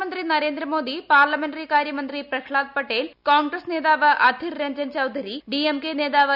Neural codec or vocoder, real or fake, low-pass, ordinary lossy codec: none; real; 5.4 kHz; none